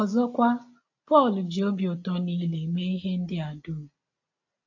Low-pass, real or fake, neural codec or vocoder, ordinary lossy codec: 7.2 kHz; real; none; AAC, 48 kbps